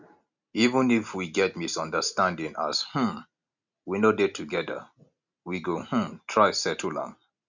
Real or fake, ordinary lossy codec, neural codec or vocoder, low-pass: real; none; none; 7.2 kHz